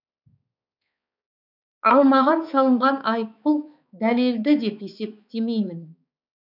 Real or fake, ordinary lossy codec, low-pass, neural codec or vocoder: fake; none; 5.4 kHz; codec, 16 kHz, 4 kbps, X-Codec, HuBERT features, trained on balanced general audio